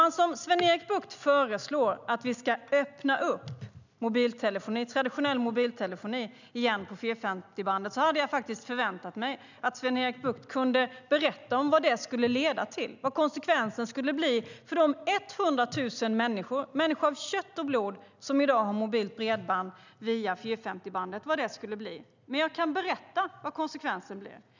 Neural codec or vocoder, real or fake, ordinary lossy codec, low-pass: none; real; none; 7.2 kHz